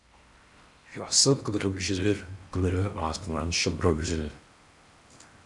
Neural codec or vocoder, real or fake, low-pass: codec, 16 kHz in and 24 kHz out, 0.6 kbps, FocalCodec, streaming, 4096 codes; fake; 10.8 kHz